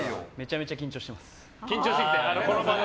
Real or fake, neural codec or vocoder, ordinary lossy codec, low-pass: real; none; none; none